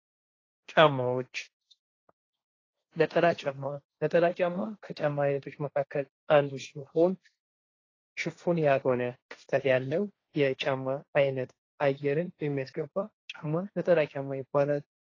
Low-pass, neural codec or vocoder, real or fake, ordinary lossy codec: 7.2 kHz; codec, 16 kHz, 1.1 kbps, Voila-Tokenizer; fake; AAC, 32 kbps